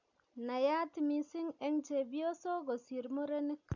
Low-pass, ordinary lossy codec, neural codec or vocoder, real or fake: 7.2 kHz; none; none; real